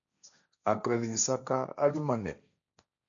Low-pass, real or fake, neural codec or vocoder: 7.2 kHz; fake; codec, 16 kHz, 1.1 kbps, Voila-Tokenizer